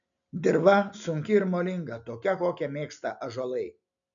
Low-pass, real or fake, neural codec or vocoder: 7.2 kHz; real; none